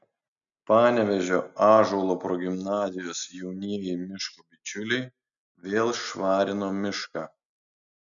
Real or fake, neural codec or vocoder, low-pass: real; none; 7.2 kHz